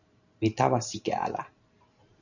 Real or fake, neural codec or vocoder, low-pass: fake; vocoder, 44.1 kHz, 128 mel bands every 512 samples, BigVGAN v2; 7.2 kHz